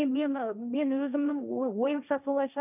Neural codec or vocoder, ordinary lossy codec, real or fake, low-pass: codec, 16 kHz, 1.1 kbps, Voila-Tokenizer; none; fake; 3.6 kHz